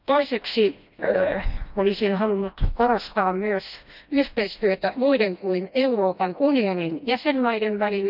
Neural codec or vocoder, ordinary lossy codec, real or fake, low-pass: codec, 16 kHz, 1 kbps, FreqCodec, smaller model; none; fake; 5.4 kHz